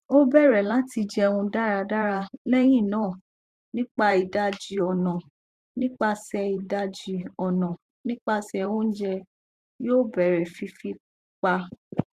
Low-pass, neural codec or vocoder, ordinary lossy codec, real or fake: 14.4 kHz; vocoder, 44.1 kHz, 128 mel bands every 512 samples, BigVGAN v2; Opus, 64 kbps; fake